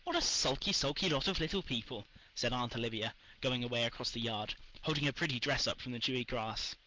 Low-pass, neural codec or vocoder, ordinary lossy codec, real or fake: 7.2 kHz; none; Opus, 16 kbps; real